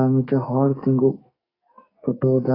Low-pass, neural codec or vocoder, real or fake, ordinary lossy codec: 5.4 kHz; codec, 44.1 kHz, 2.6 kbps, SNAC; fake; AAC, 24 kbps